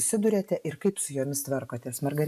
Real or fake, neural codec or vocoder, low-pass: real; none; 14.4 kHz